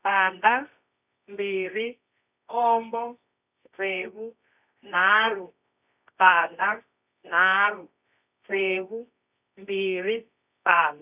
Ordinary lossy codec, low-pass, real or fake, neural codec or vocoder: none; 3.6 kHz; fake; codec, 24 kHz, 0.9 kbps, WavTokenizer, medium music audio release